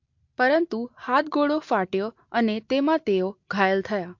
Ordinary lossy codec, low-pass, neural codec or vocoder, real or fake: MP3, 48 kbps; 7.2 kHz; none; real